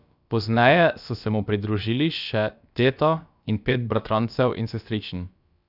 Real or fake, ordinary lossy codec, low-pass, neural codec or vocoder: fake; none; 5.4 kHz; codec, 16 kHz, about 1 kbps, DyCAST, with the encoder's durations